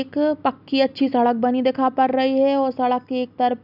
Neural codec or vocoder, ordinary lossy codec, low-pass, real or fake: none; none; 5.4 kHz; real